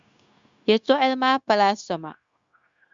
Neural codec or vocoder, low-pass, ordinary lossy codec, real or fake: codec, 16 kHz, 0.9 kbps, LongCat-Audio-Codec; 7.2 kHz; Opus, 64 kbps; fake